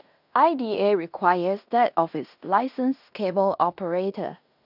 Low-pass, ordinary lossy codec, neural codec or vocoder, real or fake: 5.4 kHz; none; codec, 16 kHz in and 24 kHz out, 0.9 kbps, LongCat-Audio-Codec, fine tuned four codebook decoder; fake